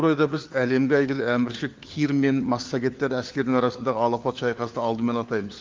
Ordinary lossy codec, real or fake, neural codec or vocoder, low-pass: Opus, 32 kbps; fake; codec, 16 kHz, 4 kbps, FunCodec, trained on LibriTTS, 50 frames a second; 7.2 kHz